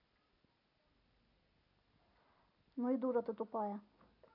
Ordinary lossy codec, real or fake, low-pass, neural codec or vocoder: none; real; 5.4 kHz; none